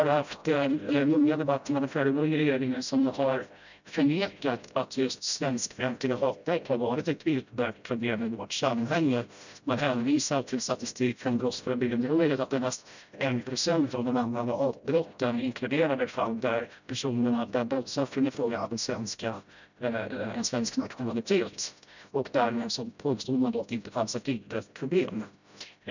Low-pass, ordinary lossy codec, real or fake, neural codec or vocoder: 7.2 kHz; none; fake; codec, 16 kHz, 0.5 kbps, FreqCodec, smaller model